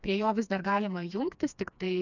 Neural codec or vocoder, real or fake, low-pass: codec, 16 kHz, 2 kbps, FreqCodec, smaller model; fake; 7.2 kHz